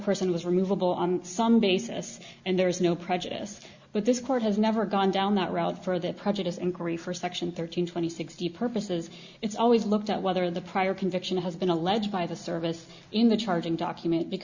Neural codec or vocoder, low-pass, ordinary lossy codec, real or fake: none; 7.2 kHz; Opus, 64 kbps; real